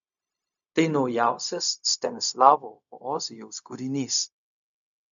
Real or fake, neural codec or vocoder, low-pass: fake; codec, 16 kHz, 0.4 kbps, LongCat-Audio-Codec; 7.2 kHz